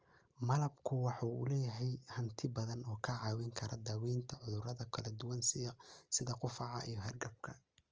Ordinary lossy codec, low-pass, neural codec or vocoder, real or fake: Opus, 24 kbps; 7.2 kHz; none; real